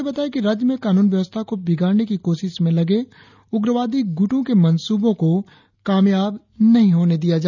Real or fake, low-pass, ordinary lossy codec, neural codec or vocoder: real; 7.2 kHz; none; none